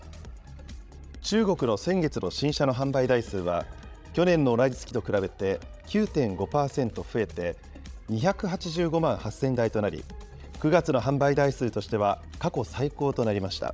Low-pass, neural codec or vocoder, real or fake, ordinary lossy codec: none; codec, 16 kHz, 16 kbps, FreqCodec, larger model; fake; none